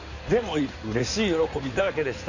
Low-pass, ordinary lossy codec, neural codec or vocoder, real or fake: 7.2 kHz; none; codec, 16 kHz in and 24 kHz out, 2.2 kbps, FireRedTTS-2 codec; fake